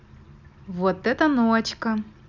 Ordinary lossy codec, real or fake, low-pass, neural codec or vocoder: none; real; 7.2 kHz; none